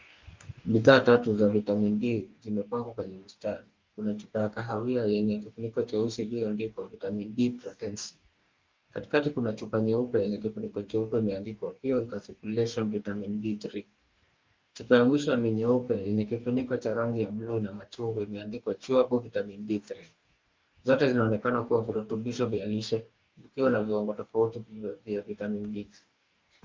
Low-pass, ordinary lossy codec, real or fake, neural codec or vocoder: 7.2 kHz; Opus, 24 kbps; fake; codec, 44.1 kHz, 2.6 kbps, DAC